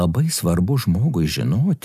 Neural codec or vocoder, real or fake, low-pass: none; real; 14.4 kHz